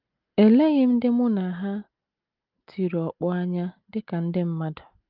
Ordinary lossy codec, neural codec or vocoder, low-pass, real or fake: Opus, 32 kbps; none; 5.4 kHz; real